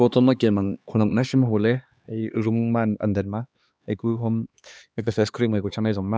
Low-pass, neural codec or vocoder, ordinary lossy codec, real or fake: none; codec, 16 kHz, 2 kbps, X-Codec, HuBERT features, trained on LibriSpeech; none; fake